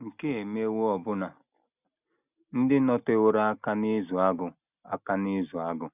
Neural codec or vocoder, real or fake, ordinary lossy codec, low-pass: none; real; none; 3.6 kHz